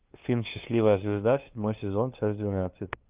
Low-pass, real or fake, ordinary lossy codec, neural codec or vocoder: 3.6 kHz; fake; Opus, 32 kbps; codec, 16 kHz, 2 kbps, FunCodec, trained on LibriTTS, 25 frames a second